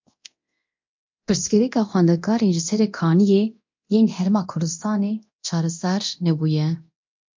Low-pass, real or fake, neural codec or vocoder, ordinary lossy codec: 7.2 kHz; fake; codec, 24 kHz, 0.9 kbps, DualCodec; MP3, 48 kbps